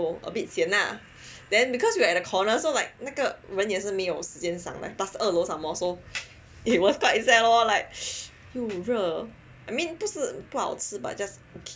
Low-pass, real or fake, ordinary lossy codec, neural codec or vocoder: none; real; none; none